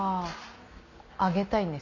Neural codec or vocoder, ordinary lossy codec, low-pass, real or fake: none; none; 7.2 kHz; real